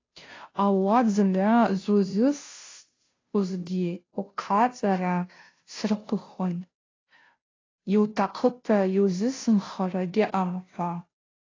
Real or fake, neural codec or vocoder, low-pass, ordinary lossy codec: fake; codec, 16 kHz, 0.5 kbps, FunCodec, trained on Chinese and English, 25 frames a second; 7.2 kHz; AAC, 32 kbps